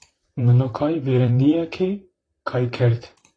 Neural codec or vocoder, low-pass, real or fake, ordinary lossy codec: vocoder, 44.1 kHz, 128 mel bands, Pupu-Vocoder; 9.9 kHz; fake; AAC, 32 kbps